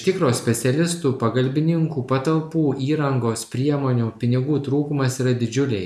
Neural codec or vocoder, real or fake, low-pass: none; real; 14.4 kHz